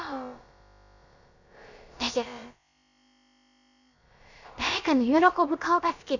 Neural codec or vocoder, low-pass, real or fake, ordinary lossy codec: codec, 16 kHz, about 1 kbps, DyCAST, with the encoder's durations; 7.2 kHz; fake; none